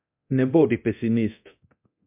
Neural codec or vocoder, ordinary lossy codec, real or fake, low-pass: codec, 24 kHz, 0.9 kbps, DualCodec; MP3, 32 kbps; fake; 3.6 kHz